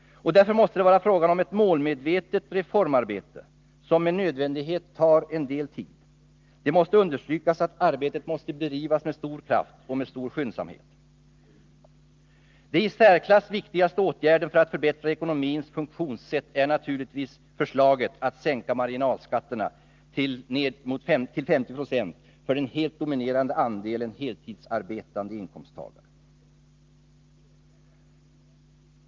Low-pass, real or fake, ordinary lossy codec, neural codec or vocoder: 7.2 kHz; real; Opus, 32 kbps; none